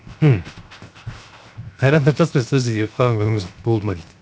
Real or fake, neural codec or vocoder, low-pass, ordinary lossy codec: fake; codec, 16 kHz, 0.7 kbps, FocalCodec; none; none